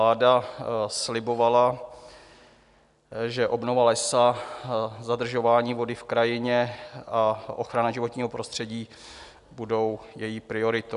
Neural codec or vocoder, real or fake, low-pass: none; real; 10.8 kHz